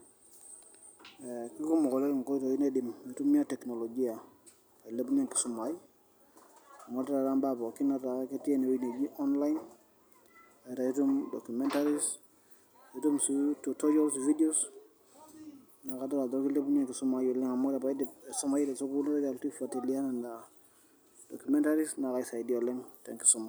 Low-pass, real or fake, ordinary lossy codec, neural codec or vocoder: none; real; none; none